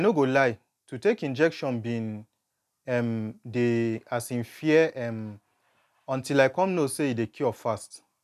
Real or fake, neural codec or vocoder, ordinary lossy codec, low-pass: real; none; none; 14.4 kHz